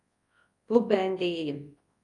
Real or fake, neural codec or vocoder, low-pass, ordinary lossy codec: fake; codec, 24 kHz, 0.9 kbps, WavTokenizer, large speech release; 10.8 kHz; AAC, 48 kbps